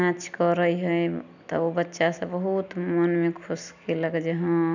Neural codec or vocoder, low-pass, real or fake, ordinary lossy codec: none; 7.2 kHz; real; none